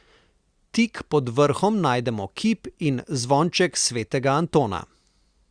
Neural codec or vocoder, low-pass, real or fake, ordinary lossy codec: none; 9.9 kHz; real; Opus, 64 kbps